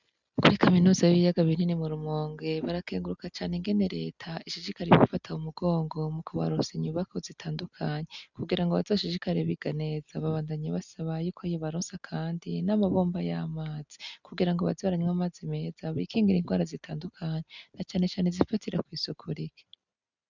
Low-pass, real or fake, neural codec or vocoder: 7.2 kHz; real; none